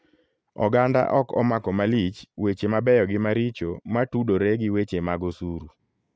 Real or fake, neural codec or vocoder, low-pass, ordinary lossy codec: real; none; none; none